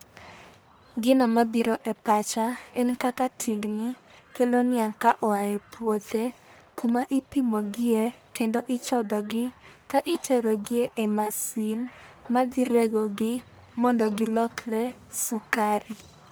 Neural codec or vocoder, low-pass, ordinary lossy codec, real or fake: codec, 44.1 kHz, 1.7 kbps, Pupu-Codec; none; none; fake